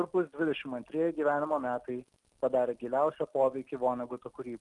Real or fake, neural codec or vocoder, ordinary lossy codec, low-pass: real; none; Opus, 32 kbps; 10.8 kHz